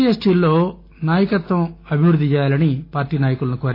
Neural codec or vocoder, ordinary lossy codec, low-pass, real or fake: none; AAC, 32 kbps; 5.4 kHz; real